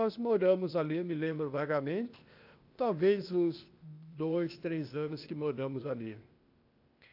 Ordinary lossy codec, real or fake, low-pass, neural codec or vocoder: AAC, 48 kbps; fake; 5.4 kHz; codec, 16 kHz, 0.8 kbps, ZipCodec